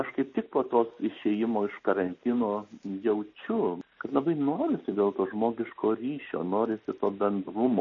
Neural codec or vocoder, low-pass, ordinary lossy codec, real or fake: none; 7.2 kHz; MP3, 32 kbps; real